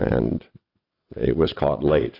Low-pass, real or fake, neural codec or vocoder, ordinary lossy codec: 5.4 kHz; fake; vocoder, 22.05 kHz, 80 mel bands, WaveNeXt; AAC, 32 kbps